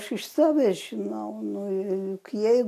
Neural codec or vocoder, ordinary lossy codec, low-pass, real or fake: none; AAC, 64 kbps; 14.4 kHz; real